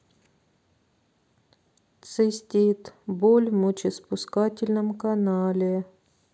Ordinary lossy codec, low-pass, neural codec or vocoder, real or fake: none; none; none; real